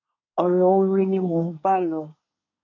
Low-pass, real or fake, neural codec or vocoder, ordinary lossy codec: 7.2 kHz; fake; codec, 24 kHz, 1 kbps, SNAC; none